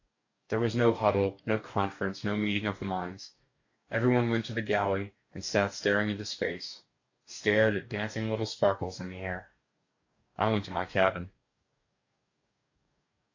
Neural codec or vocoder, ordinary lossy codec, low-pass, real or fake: codec, 44.1 kHz, 2.6 kbps, DAC; AAC, 48 kbps; 7.2 kHz; fake